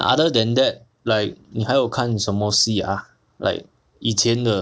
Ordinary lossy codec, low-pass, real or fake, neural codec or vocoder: none; none; real; none